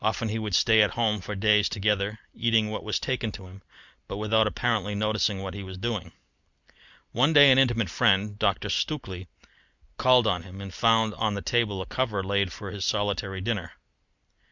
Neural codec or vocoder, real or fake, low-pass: none; real; 7.2 kHz